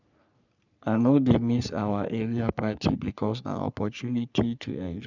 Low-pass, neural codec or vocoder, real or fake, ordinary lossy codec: 7.2 kHz; codec, 44.1 kHz, 3.4 kbps, Pupu-Codec; fake; none